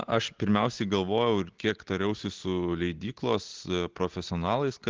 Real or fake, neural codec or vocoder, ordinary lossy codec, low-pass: real; none; Opus, 16 kbps; 7.2 kHz